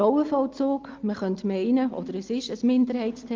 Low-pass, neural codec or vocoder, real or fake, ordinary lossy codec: 7.2 kHz; none; real; Opus, 32 kbps